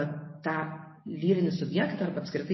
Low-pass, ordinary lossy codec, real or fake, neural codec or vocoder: 7.2 kHz; MP3, 24 kbps; real; none